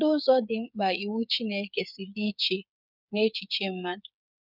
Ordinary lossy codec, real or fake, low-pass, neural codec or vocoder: none; fake; 5.4 kHz; codec, 16 kHz, 8 kbps, FreqCodec, smaller model